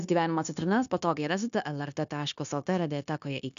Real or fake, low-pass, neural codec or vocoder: fake; 7.2 kHz; codec, 16 kHz, 0.9 kbps, LongCat-Audio-Codec